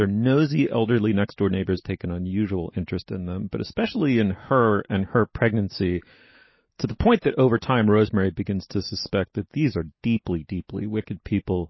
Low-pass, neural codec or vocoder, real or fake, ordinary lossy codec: 7.2 kHz; none; real; MP3, 24 kbps